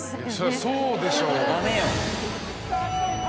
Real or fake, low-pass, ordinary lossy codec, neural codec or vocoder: real; none; none; none